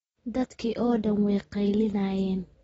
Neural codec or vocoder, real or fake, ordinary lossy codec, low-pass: none; real; AAC, 24 kbps; 10.8 kHz